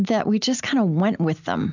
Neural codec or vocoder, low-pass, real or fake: none; 7.2 kHz; real